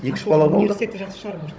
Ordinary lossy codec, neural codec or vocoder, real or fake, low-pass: none; codec, 16 kHz, 16 kbps, FunCodec, trained on Chinese and English, 50 frames a second; fake; none